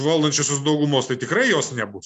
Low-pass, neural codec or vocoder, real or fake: 9.9 kHz; none; real